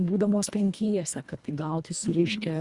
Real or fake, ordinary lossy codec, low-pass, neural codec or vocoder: fake; Opus, 64 kbps; 10.8 kHz; codec, 24 kHz, 1.5 kbps, HILCodec